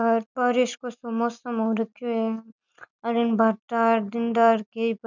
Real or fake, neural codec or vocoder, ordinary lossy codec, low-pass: real; none; none; 7.2 kHz